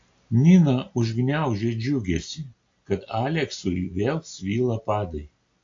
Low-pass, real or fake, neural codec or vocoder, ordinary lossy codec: 7.2 kHz; real; none; AAC, 48 kbps